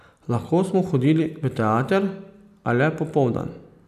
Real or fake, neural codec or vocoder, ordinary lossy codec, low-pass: real; none; none; 14.4 kHz